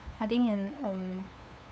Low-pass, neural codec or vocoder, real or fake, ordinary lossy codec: none; codec, 16 kHz, 2 kbps, FunCodec, trained on LibriTTS, 25 frames a second; fake; none